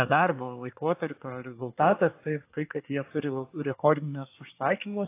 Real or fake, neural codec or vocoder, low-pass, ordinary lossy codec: fake; codec, 24 kHz, 1 kbps, SNAC; 3.6 kHz; AAC, 24 kbps